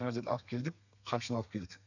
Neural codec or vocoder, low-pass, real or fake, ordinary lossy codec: codec, 32 kHz, 1.9 kbps, SNAC; 7.2 kHz; fake; none